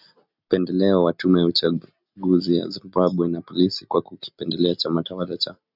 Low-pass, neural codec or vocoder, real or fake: 5.4 kHz; none; real